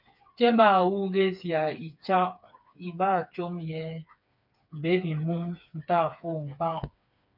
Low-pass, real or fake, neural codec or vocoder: 5.4 kHz; fake; codec, 16 kHz, 4 kbps, FreqCodec, smaller model